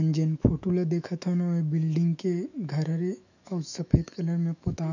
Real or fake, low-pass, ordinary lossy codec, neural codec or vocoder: fake; 7.2 kHz; none; autoencoder, 48 kHz, 128 numbers a frame, DAC-VAE, trained on Japanese speech